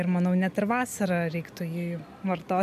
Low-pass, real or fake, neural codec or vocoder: 14.4 kHz; real; none